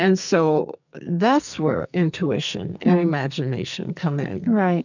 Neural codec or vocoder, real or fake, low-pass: codec, 44.1 kHz, 2.6 kbps, SNAC; fake; 7.2 kHz